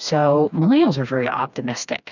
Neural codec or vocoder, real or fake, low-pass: codec, 16 kHz, 2 kbps, FreqCodec, smaller model; fake; 7.2 kHz